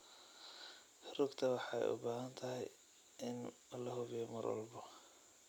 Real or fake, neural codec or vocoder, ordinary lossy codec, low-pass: real; none; none; 19.8 kHz